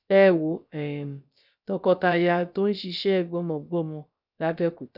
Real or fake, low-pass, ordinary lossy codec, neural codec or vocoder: fake; 5.4 kHz; none; codec, 16 kHz, about 1 kbps, DyCAST, with the encoder's durations